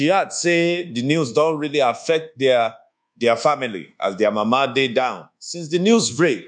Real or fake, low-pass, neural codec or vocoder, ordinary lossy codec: fake; 9.9 kHz; codec, 24 kHz, 1.2 kbps, DualCodec; none